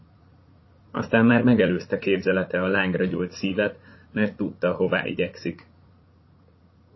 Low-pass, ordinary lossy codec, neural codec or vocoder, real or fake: 7.2 kHz; MP3, 24 kbps; vocoder, 44.1 kHz, 80 mel bands, Vocos; fake